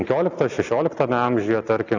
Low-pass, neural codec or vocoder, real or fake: 7.2 kHz; none; real